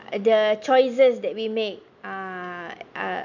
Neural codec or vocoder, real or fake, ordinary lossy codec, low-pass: none; real; none; 7.2 kHz